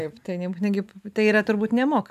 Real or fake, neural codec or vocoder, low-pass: real; none; 14.4 kHz